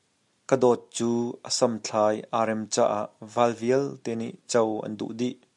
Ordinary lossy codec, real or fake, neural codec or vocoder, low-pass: MP3, 96 kbps; real; none; 10.8 kHz